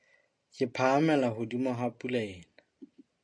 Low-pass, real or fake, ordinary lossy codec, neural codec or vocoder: 9.9 kHz; real; AAC, 64 kbps; none